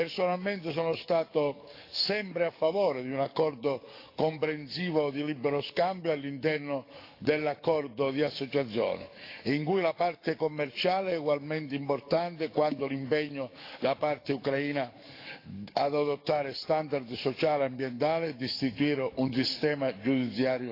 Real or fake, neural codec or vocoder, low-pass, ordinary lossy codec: fake; codec, 44.1 kHz, 7.8 kbps, DAC; 5.4 kHz; AAC, 32 kbps